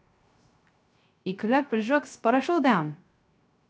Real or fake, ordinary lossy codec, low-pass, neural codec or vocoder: fake; none; none; codec, 16 kHz, 0.3 kbps, FocalCodec